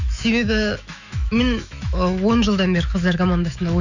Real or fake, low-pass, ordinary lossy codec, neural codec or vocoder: fake; 7.2 kHz; none; autoencoder, 48 kHz, 128 numbers a frame, DAC-VAE, trained on Japanese speech